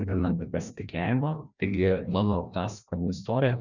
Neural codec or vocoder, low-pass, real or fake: codec, 16 kHz, 1 kbps, FreqCodec, larger model; 7.2 kHz; fake